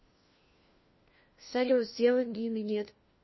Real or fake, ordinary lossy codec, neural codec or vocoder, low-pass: fake; MP3, 24 kbps; codec, 16 kHz, 1 kbps, FunCodec, trained on LibriTTS, 50 frames a second; 7.2 kHz